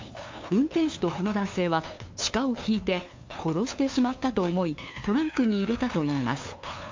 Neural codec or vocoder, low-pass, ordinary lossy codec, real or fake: codec, 16 kHz, 2 kbps, FunCodec, trained on LibriTTS, 25 frames a second; 7.2 kHz; MP3, 48 kbps; fake